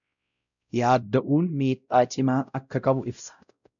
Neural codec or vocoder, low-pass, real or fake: codec, 16 kHz, 0.5 kbps, X-Codec, WavLM features, trained on Multilingual LibriSpeech; 7.2 kHz; fake